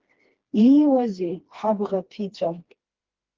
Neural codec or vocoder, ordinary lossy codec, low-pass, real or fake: codec, 16 kHz, 2 kbps, FreqCodec, smaller model; Opus, 16 kbps; 7.2 kHz; fake